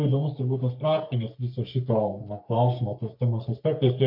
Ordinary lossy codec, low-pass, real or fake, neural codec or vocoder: MP3, 48 kbps; 5.4 kHz; fake; codec, 44.1 kHz, 3.4 kbps, Pupu-Codec